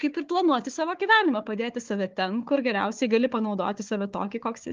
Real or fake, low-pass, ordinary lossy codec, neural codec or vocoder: fake; 7.2 kHz; Opus, 32 kbps; codec, 16 kHz, 4 kbps, FunCodec, trained on Chinese and English, 50 frames a second